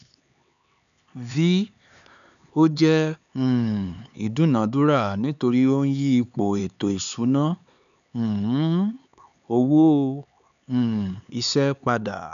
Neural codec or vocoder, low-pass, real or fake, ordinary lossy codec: codec, 16 kHz, 4 kbps, X-Codec, HuBERT features, trained on LibriSpeech; 7.2 kHz; fake; none